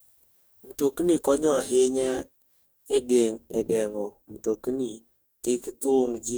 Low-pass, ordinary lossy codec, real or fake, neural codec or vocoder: none; none; fake; codec, 44.1 kHz, 2.6 kbps, DAC